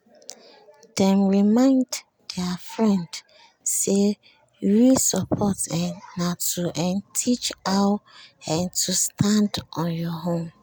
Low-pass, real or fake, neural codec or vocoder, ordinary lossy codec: none; real; none; none